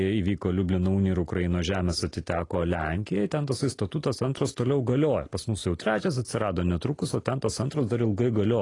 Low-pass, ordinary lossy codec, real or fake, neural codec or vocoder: 10.8 kHz; AAC, 32 kbps; real; none